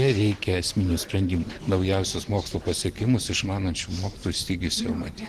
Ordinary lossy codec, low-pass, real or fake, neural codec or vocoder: Opus, 16 kbps; 14.4 kHz; fake; vocoder, 44.1 kHz, 128 mel bands every 512 samples, BigVGAN v2